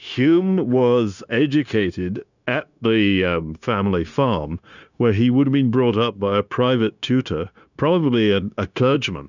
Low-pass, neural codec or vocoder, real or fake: 7.2 kHz; codec, 16 kHz, 0.9 kbps, LongCat-Audio-Codec; fake